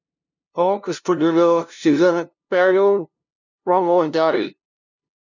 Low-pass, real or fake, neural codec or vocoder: 7.2 kHz; fake; codec, 16 kHz, 0.5 kbps, FunCodec, trained on LibriTTS, 25 frames a second